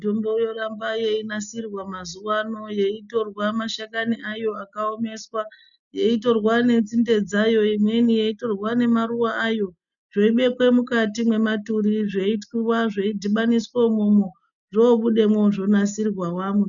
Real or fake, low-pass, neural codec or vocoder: real; 7.2 kHz; none